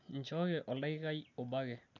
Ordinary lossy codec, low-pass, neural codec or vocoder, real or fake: none; 7.2 kHz; none; real